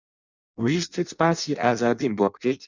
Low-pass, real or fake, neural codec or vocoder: 7.2 kHz; fake; codec, 16 kHz in and 24 kHz out, 0.6 kbps, FireRedTTS-2 codec